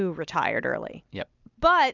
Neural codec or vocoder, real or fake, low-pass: none; real; 7.2 kHz